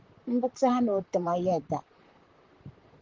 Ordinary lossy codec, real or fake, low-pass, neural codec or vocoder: Opus, 16 kbps; fake; 7.2 kHz; vocoder, 44.1 kHz, 128 mel bands, Pupu-Vocoder